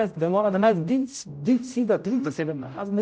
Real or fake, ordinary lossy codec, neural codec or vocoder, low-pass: fake; none; codec, 16 kHz, 0.5 kbps, X-Codec, HuBERT features, trained on general audio; none